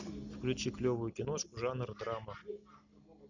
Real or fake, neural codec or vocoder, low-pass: real; none; 7.2 kHz